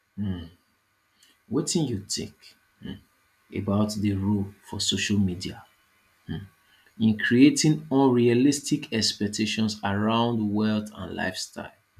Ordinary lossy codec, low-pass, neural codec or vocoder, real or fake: none; 14.4 kHz; none; real